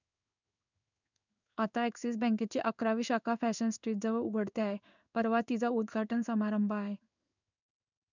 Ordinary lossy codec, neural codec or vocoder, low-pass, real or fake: none; codec, 16 kHz in and 24 kHz out, 1 kbps, XY-Tokenizer; 7.2 kHz; fake